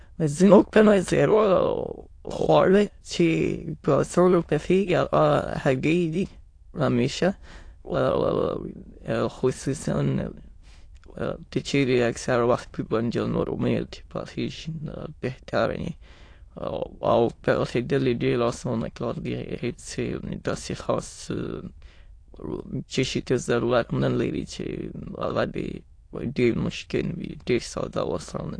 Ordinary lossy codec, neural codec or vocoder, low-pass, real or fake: AAC, 48 kbps; autoencoder, 22.05 kHz, a latent of 192 numbers a frame, VITS, trained on many speakers; 9.9 kHz; fake